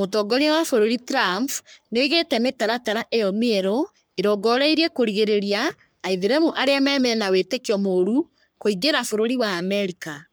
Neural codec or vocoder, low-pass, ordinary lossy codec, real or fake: codec, 44.1 kHz, 3.4 kbps, Pupu-Codec; none; none; fake